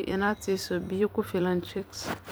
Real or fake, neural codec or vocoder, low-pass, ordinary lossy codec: fake; vocoder, 44.1 kHz, 128 mel bands every 256 samples, BigVGAN v2; none; none